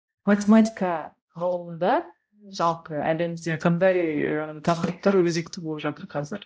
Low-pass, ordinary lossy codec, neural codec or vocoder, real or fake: none; none; codec, 16 kHz, 0.5 kbps, X-Codec, HuBERT features, trained on balanced general audio; fake